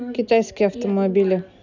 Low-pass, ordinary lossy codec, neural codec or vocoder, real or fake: 7.2 kHz; none; none; real